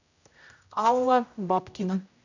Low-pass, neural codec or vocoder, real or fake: 7.2 kHz; codec, 16 kHz, 0.5 kbps, X-Codec, HuBERT features, trained on general audio; fake